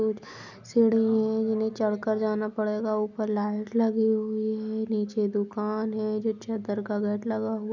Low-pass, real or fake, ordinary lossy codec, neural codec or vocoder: 7.2 kHz; real; none; none